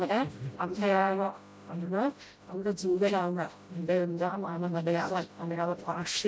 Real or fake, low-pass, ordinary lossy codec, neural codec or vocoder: fake; none; none; codec, 16 kHz, 0.5 kbps, FreqCodec, smaller model